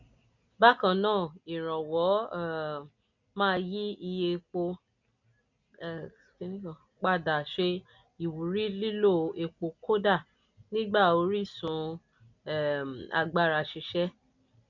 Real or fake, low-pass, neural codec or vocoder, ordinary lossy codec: real; 7.2 kHz; none; none